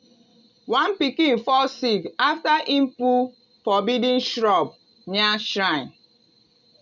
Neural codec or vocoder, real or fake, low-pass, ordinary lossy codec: none; real; 7.2 kHz; none